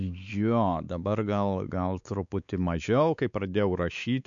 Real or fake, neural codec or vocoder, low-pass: fake; codec, 16 kHz, 4 kbps, X-Codec, WavLM features, trained on Multilingual LibriSpeech; 7.2 kHz